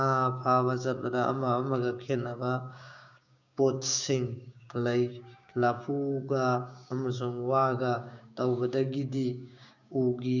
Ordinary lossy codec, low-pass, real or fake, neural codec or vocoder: none; 7.2 kHz; fake; codec, 16 kHz, 6 kbps, DAC